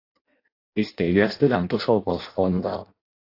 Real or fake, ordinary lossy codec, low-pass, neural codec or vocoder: fake; AAC, 32 kbps; 5.4 kHz; codec, 16 kHz in and 24 kHz out, 0.6 kbps, FireRedTTS-2 codec